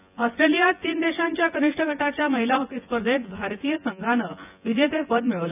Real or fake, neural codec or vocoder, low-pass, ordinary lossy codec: fake; vocoder, 24 kHz, 100 mel bands, Vocos; 3.6 kHz; none